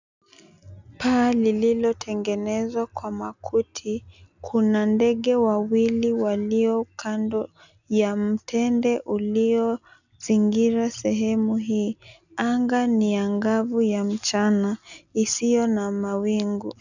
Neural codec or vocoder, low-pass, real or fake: none; 7.2 kHz; real